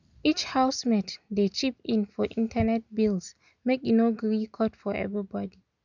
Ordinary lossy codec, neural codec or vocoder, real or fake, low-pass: none; none; real; 7.2 kHz